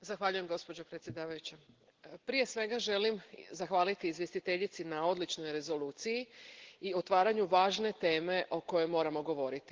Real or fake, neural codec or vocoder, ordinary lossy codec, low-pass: real; none; Opus, 16 kbps; 7.2 kHz